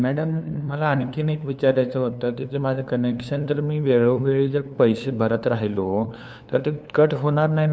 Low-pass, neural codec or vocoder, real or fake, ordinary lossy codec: none; codec, 16 kHz, 2 kbps, FunCodec, trained on LibriTTS, 25 frames a second; fake; none